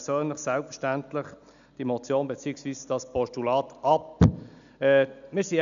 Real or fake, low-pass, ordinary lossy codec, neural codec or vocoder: real; 7.2 kHz; none; none